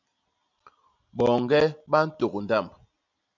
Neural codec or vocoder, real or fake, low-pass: none; real; 7.2 kHz